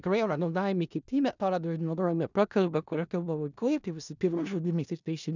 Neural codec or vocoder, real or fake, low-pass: codec, 16 kHz in and 24 kHz out, 0.4 kbps, LongCat-Audio-Codec, four codebook decoder; fake; 7.2 kHz